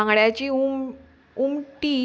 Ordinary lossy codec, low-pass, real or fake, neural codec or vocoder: none; none; real; none